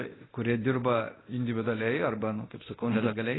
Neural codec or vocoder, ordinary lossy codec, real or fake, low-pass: codec, 24 kHz, 0.9 kbps, DualCodec; AAC, 16 kbps; fake; 7.2 kHz